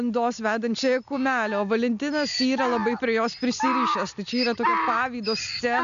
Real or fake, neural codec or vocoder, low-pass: real; none; 7.2 kHz